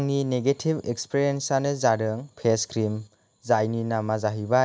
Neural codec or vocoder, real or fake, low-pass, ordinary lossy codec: none; real; none; none